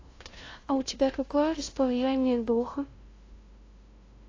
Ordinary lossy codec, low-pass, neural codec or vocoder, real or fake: AAC, 32 kbps; 7.2 kHz; codec, 16 kHz, 0.5 kbps, FunCodec, trained on LibriTTS, 25 frames a second; fake